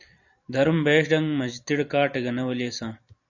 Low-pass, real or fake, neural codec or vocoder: 7.2 kHz; fake; vocoder, 44.1 kHz, 128 mel bands every 256 samples, BigVGAN v2